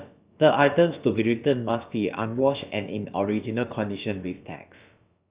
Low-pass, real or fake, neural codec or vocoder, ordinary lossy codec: 3.6 kHz; fake; codec, 16 kHz, about 1 kbps, DyCAST, with the encoder's durations; Opus, 64 kbps